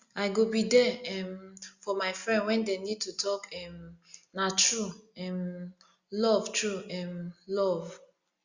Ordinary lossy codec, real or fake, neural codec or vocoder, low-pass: Opus, 64 kbps; real; none; 7.2 kHz